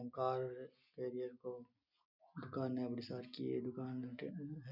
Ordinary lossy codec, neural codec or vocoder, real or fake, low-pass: none; none; real; 5.4 kHz